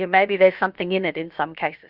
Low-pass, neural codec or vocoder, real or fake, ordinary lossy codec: 5.4 kHz; codec, 16 kHz, about 1 kbps, DyCAST, with the encoder's durations; fake; Opus, 64 kbps